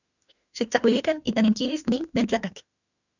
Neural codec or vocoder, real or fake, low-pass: autoencoder, 48 kHz, 32 numbers a frame, DAC-VAE, trained on Japanese speech; fake; 7.2 kHz